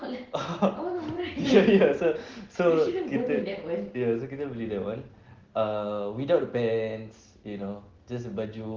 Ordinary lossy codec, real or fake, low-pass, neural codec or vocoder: Opus, 16 kbps; real; 7.2 kHz; none